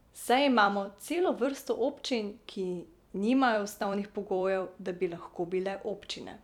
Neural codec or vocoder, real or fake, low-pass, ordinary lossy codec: vocoder, 44.1 kHz, 128 mel bands every 256 samples, BigVGAN v2; fake; 19.8 kHz; none